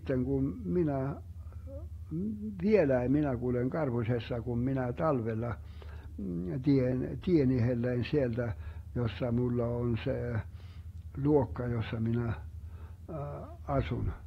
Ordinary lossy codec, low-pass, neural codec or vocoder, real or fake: AAC, 48 kbps; 19.8 kHz; none; real